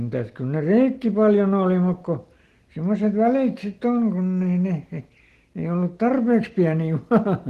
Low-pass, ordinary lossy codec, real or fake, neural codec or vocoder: 14.4 kHz; Opus, 16 kbps; real; none